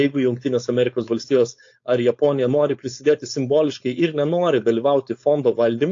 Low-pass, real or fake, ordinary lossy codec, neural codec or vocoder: 7.2 kHz; fake; AAC, 48 kbps; codec, 16 kHz, 4.8 kbps, FACodec